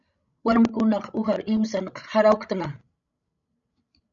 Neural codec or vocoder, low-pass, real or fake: codec, 16 kHz, 16 kbps, FreqCodec, larger model; 7.2 kHz; fake